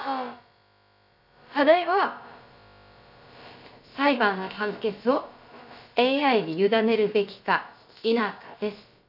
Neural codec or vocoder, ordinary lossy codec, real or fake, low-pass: codec, 16 kHz, about 1 kbps, DyCAST, with the encoder's durations; none; fake; 5.4 kHz